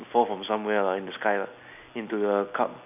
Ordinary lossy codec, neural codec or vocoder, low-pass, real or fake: none; none; 3.6 kHz; real